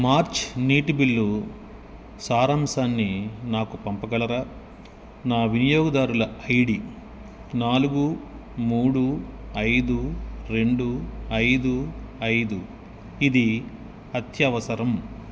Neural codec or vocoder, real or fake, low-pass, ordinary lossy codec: none; real; none; none